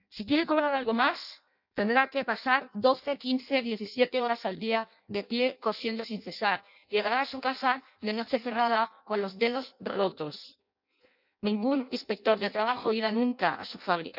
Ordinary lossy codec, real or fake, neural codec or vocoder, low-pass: none; fake; codec, 16 kHz in and 24 kHz out, 0.6 kbps, FireRedTTS-2 codec; 5.4 kHz